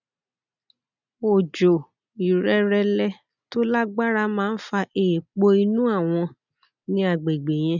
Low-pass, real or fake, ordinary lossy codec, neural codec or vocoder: 7.2 kHz; real; none; none